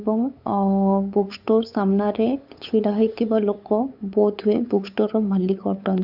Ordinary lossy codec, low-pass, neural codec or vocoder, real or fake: AAC, 48 kbps; 5.4 kHz; codec, 16 kHz, 8 kbps, FunCodec, trained on LibriTTS, 25 frames a second; fake